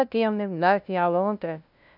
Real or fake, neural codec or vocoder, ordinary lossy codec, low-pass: fake; codec, 16 kHz, 0.5 kbps, FunCodec, trained on LibriTTS, 25 frames a second; none; 5.4 kHz